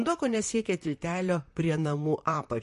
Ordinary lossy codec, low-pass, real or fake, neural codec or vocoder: MP3, 48 kbps; 14.4 kHz; fake; vocoder, 44.1 kHz, 128 mel bands, Pupu-Vocoder